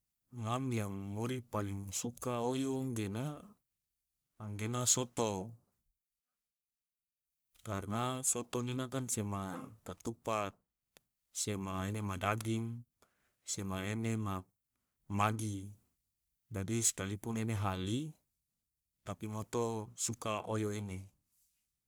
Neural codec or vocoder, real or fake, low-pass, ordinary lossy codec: codec, 44.1 kHz, 1.7 kbps, Pupu-Codec; fake; none; none